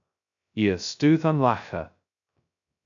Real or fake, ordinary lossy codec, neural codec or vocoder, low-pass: fake; MP3, 96 kbps; codec, 16 kHz, 0.2 kbps, FocalCodec; 7.2 kHz